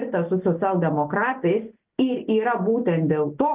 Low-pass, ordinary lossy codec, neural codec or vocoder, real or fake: 3.6 kHz; Opus, 24 kbps; none; real